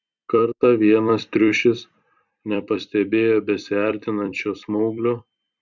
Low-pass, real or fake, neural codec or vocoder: 7.2 kHz; fake; vocoder, 44.1 kHz, 128 mel bands every 256 samples, BigVGAN v2